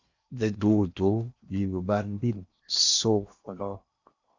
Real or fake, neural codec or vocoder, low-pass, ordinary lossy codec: fake; codec, 16 kHz in and 24 kHz out, 0.8 kbps, FocalCodec, streaming, 65536 codes; 7.2 kHz; Opus, 64 kbps